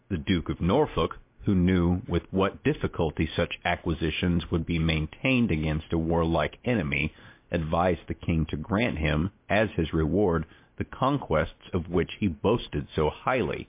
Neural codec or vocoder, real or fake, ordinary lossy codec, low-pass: none; real; MP3, 24 kbps; 3.6 kHz